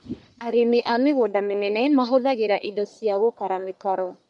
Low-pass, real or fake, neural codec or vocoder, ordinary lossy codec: 10.8 kHz; fake; codec, 44.1 kHz, 1.7 kbps, Pupu-Codec; none